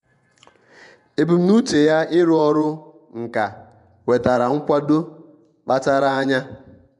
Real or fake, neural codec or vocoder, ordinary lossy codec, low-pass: fake; vocoder, 24 kHz, 100 mel bands, Vocos; none; 10.8 kHz